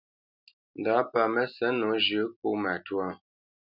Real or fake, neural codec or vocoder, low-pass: real; none; 5.4 kHz